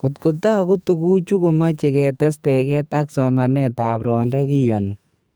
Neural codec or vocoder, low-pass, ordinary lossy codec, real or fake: codec, 44.1 kHz, 2.6 kbps, SNAC; none; none; fake